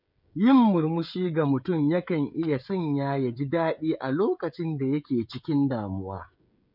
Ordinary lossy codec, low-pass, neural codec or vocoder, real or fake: none; 5.4 kHz; codec, 16 kHz, 16 kbps, FreqCodec, smaller model; fake